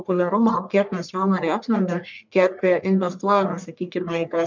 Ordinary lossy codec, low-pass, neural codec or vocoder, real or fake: MP3, 64 kbps; 7.2 kHz; codec, 44.1 kHz, 1.7 kbps, Pupu-Codec; fake